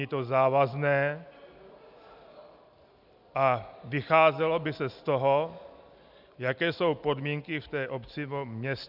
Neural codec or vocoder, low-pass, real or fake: none; 5.4 kHz; real